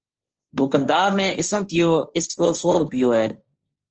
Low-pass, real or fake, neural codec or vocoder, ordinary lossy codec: 7.2 kHz; fake; codec, 16 kHz, 1.1 kbps, Voila-Tokenizer; Opus, 24 kbps